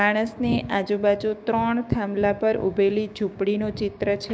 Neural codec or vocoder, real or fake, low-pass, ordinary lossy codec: codec, 16 kHz, 6 kbps, DAC; fake; none; none